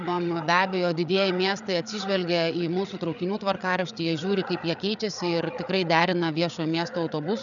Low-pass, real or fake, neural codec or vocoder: 7.2 kHz; fake; codec, 16 kHz, 8 kbps, FreqCodec, larger model